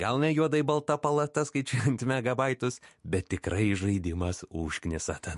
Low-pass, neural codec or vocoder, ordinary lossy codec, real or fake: 14.4 kHz; autoencoder, 48 kHz, 128 numbers a frame, DAC-VAE, trained on Japanese speech; MP3, 48 kbps; fake